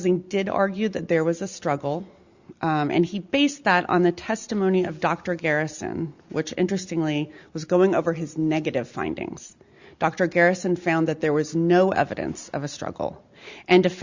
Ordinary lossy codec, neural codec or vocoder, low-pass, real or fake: Opus, 64 kbps; none; 7.2 kHz; real